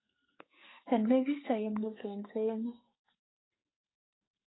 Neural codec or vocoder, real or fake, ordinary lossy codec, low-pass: codec, 44.1 kHz, 2.6 kbps, SNAC; fake; AAC, 16 kbps; 7.2 kHz